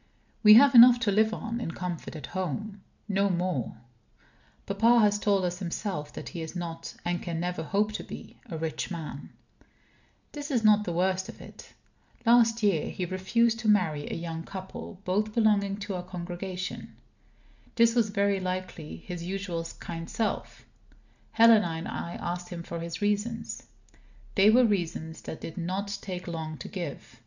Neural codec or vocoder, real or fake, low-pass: none; real; 7.2 kHz